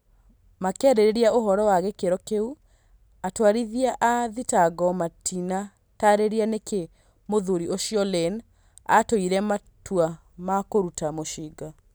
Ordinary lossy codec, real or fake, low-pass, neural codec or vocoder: none; real; none; none